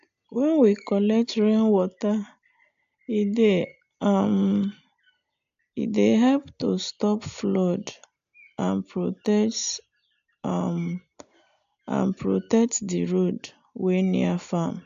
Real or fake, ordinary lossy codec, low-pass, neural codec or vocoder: real; AAC, 64 kbps; 7.2 kHz; none